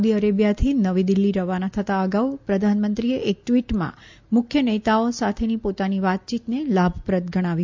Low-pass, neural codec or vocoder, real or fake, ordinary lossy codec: 7.2 kHz; none; real; MP3, 64 kbps